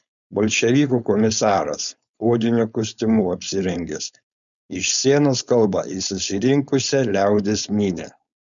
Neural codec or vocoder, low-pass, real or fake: codec, 16 kHz, 4.8 kbps, FACodec; 7.2 kHz; fake